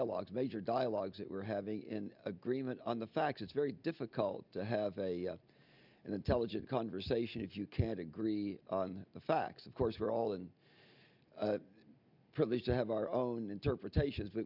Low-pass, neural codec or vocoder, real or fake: 5.4 kHz; none; real